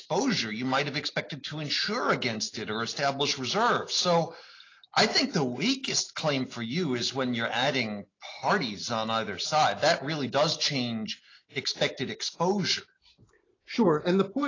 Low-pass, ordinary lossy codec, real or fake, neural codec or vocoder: 7.2 kHz; AAC, 32 kbps; real; none